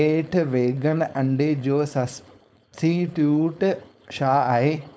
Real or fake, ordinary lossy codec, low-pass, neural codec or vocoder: fake; none; none; codec, 16 kHz, 4.8 kbps, FACodec